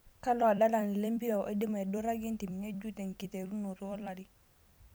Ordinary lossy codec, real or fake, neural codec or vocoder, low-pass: none; fake; vocoder, 44.1 kHz, 128 mel bands every 256 samples, BigVGAN v2; none